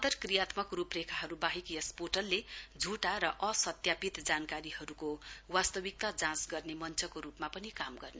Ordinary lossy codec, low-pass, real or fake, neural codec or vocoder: none; none; real; none